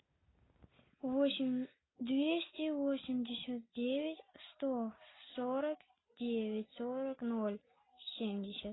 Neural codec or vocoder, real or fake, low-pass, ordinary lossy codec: none; real; 7.2 kHz; AAC, 16 kbps